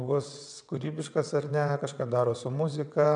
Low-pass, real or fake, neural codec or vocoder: 9.9 kHz; fake; vocoder, 22.05 kHz, 80 mel bands, WaveNeXt